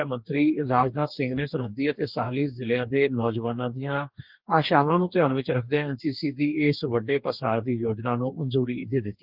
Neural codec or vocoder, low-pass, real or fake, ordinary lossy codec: codec, 44.1 kHz, 2.6 kbps, DAC; 5.4 kHz; fake; Opus, 32 kbps